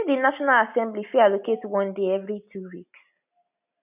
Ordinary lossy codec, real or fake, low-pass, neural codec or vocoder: none; real; 3.6 kHz; none